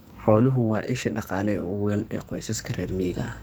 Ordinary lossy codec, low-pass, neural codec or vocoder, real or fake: none; none; codec, 44.1 kHz, 2.6 kbps, SNAC; fake